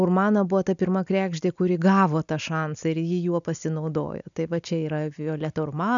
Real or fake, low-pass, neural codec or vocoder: real; 7.2 kHz; none